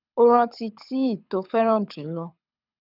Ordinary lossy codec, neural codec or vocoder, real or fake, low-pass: none; codec, 24 kHz, 6 kbps, HILCodec; fake; 5.4 kHz